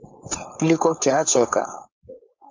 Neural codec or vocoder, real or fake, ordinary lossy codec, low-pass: codec, 16 kHz, 4.8 kbps, FACodec; fake; MP3, 64 kbps; 7.2 kHz